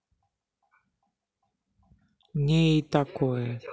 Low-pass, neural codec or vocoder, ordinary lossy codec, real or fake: none; none; none; real